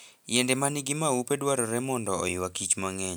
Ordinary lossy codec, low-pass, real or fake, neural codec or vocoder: none; none; real; none